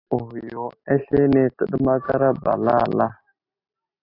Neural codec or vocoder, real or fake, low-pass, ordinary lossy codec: none; real; 5.4 kHz; AAC, 32 kbps